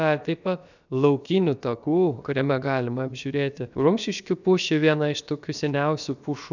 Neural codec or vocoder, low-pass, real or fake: codec, 16 kHz, about 1 kbps, DyCAST, with the encoder's durations; 7.2 kHz; fake